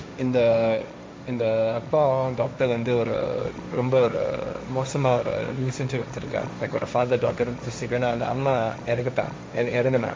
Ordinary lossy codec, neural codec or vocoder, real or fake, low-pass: none; codec, 16 kHz, 1.1 kbps, Voila-Tokenizer; fake; none